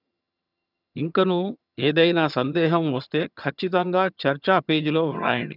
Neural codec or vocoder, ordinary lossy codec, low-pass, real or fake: vocoder, 22.05 kHz, 80 mel bands, HiFi-GAN; none; 5.4 kHz; fake